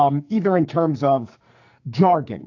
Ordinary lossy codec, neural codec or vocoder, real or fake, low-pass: AAC, 48 kbps; codec, 44.1 kHz, 2.6 kbps, SNAC; fake; 7.2 kHz